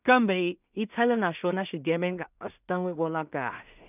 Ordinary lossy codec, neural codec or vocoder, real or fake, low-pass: none; codec, 16 kHz in and 24 kHz out, 0.4 kbps, LongCat-Audio-Codec, two codebook decoder; fake; 3.6 kHz